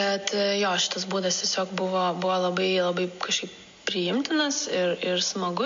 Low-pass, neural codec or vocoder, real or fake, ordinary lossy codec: 7.2 kHz; none; real; MP3, 48 kbps